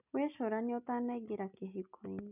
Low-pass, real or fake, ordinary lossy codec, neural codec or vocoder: 3.6 kHz; real; none; none